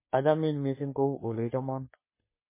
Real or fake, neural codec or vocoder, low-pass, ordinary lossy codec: fake; codec, 44.1 kHz, 3.4 kbps, Pupu-Codec; 3.6 kHz; MP3, 16 kbps